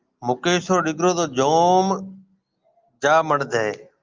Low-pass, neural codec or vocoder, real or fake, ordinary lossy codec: 7.2 kHz; none; real; Opus, 32 kbps